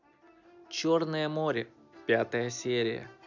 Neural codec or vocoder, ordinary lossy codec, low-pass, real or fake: none; none; 7.2 kHz; real